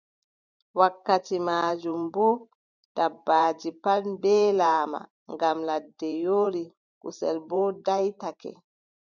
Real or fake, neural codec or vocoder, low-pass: fake; vocoder, 44.1 kHz, 128 mel bands every 512 samples, BigVGAN v2; 7.2 kHz